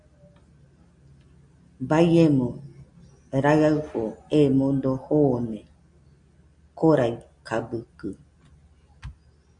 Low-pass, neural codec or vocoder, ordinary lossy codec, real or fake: 9.9 kHz; none; MP3, 64 kbps; real